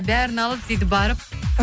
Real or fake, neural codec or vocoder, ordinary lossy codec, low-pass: real; none; none; none